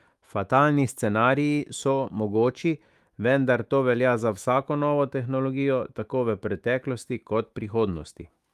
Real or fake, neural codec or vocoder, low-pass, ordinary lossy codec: fake; autoencoder, 48 kHz, 128 numbers a frame, DAC-VAE, trained on Japanese speech; 14.4 kHz; Opus, 32 kbps